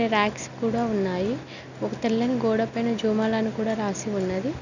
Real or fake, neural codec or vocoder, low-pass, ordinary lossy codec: real; none; 7.2 kHz; none